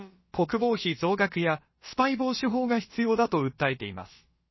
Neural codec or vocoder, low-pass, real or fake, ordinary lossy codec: codec, 16 kHz, about 1 kbps, DyCAST, with the encoder's durations; 7.2 kHz; fake; MP3, 24 kbps